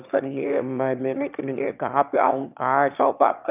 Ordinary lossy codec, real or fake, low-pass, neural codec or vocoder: none; fake; 3.6 kHz; autoencoder, 22.05 kHz, a latent of 192 numbers a frame, VITS, trained on one speaker